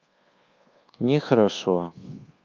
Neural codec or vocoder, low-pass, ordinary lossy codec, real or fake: codec, 24 kHz, 1.2 kbps, DualCodec; 7.2 kHz; Opus, 32 kbps; fake